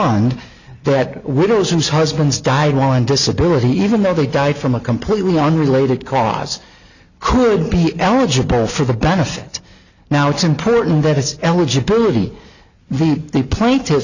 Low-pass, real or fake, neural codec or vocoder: 7.2 kHz; real; none